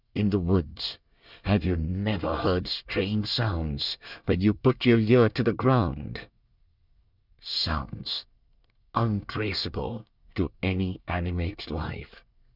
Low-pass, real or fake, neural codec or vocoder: 5.4 kHz; fake; codec, 24 kHz, 1 kbps, SNAC